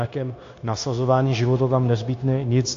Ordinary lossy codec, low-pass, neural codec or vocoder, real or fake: AAC, 48 kbps; 7.2 kHz; codec, 16 kHz, 0.9 kbps, LongCat-Audio-Codec; fake